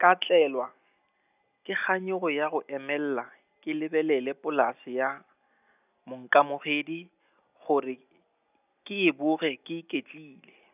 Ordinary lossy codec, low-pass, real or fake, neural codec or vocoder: none; 3.6 kHz; real; none